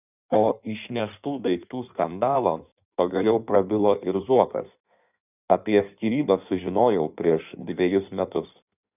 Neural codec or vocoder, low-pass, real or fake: codec, 16 kHz in and 24 kHz out, 1.1 kbps, FireRedTTS-2 codec; 3.6 kHz; fake